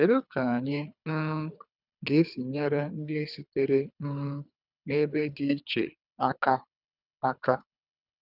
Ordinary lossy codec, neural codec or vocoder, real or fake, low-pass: none; codec, 24 kHz, 3 kbps, HILCodec; fake; 5.4 kHz